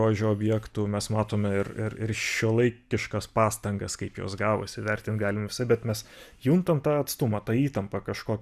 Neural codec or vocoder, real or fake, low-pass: none; real; 14.4 kHz